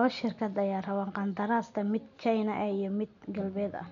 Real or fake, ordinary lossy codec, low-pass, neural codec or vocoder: real; none; 7.2 kHz; none